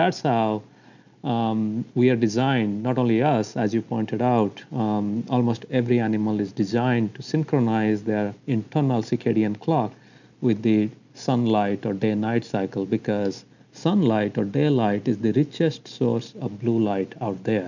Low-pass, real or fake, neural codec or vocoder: 7.2 kHz; real; none